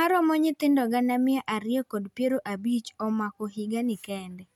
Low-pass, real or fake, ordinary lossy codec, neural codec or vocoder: 19.8 kHz; real; none; none